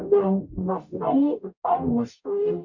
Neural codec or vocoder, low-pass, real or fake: codec, 44.1 kHz, 0.9 kbps, DAC; 7.2 kHz; fake